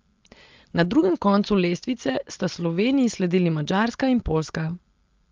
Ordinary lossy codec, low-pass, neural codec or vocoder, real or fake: Opus, 32 kbps; 7.2 kHz; none; real